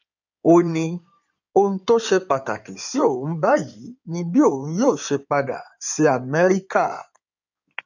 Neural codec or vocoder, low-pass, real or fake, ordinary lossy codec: codec, 16 kHz in and 24 kHz out, 2.2 kbps, FireRedTTS-2 codec; 7.2 kHz; fake; none